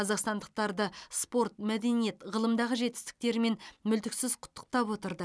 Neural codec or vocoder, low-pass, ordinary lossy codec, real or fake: none; none; none; real